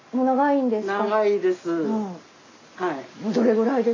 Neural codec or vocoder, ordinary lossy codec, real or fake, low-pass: none; AAC, 32 kbps; real; 7.2 kHz